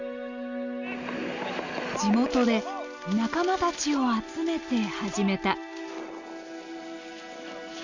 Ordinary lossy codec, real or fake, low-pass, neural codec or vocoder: Opus, 64 kbps; real; 7.2 kHz; none